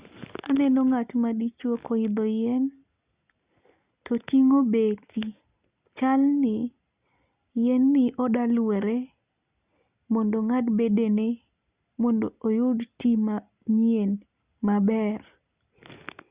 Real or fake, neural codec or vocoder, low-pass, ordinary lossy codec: real; none; 3.6 kHz; Opus, 64 kbps